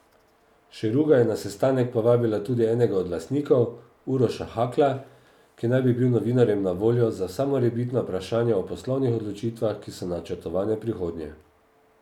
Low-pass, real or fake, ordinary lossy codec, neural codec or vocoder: 19.8 kHz; real; none; none